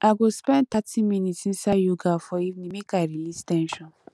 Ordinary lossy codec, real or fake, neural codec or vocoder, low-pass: none; real; none; none